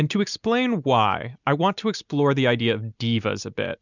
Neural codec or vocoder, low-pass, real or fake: none; 7.2 kHz; real